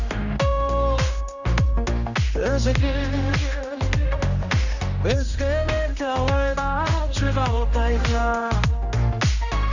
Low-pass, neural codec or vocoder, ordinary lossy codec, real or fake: 7.2 kHz; codec, 16 kHz, 1 kbps, X-Codec, HuBERT features, trained on general audio; none; fake